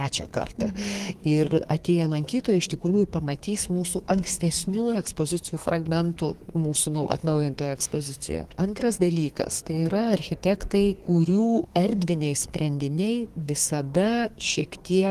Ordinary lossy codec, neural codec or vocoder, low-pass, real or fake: Opus, 24 kbps; codec, 32 kHz, 1.9 kbps, SNAC; 14.4 kHz; fake